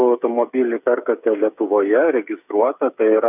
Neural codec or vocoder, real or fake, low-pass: codec, 16 kHz, 8 kbps, FreqCodec, smaller model; fake; 3.6 kHz